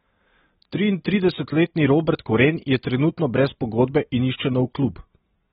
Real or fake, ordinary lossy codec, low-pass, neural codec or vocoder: real; AAC, 16 kbps; 19.8 kHz; none